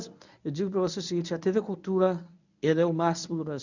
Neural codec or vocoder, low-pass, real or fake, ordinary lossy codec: codec, 24 kHz, 0.9 kbps, WavTokenizer, medium speech release version 1; 7.2 kHz; fake; none